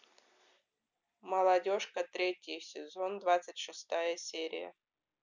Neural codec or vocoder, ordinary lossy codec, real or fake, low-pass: none; none; real; 7.2 kHz